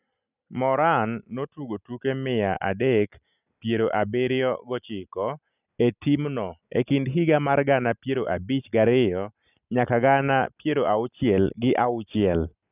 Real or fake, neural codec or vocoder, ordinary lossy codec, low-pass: real; none; none; 3.6 kHz